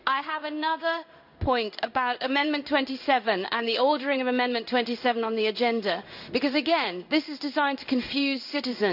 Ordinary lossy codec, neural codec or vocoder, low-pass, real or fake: none; codec, 16 kHz in and 24 kHz out, 1 kbps, XY-Tokenizer; 5.4 kHz; fake